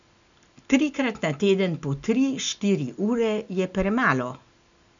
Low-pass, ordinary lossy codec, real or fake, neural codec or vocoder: 7.2 kHz; none; real; none